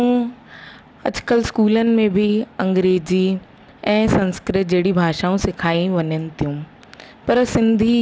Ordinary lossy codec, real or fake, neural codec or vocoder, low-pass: none; real; none; none